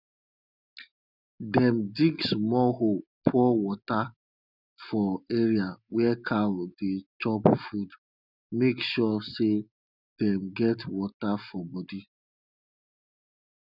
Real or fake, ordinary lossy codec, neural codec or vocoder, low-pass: real; none; none; 5.4 kHz